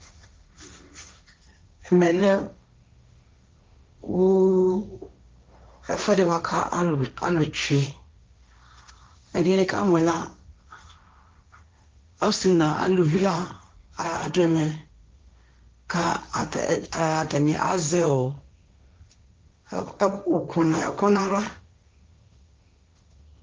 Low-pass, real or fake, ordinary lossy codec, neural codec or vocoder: 7.2 kHz; fake; Opus, 24 kbps; codec, 16 kHz, 1.1 kbps, Voila-Tokenizer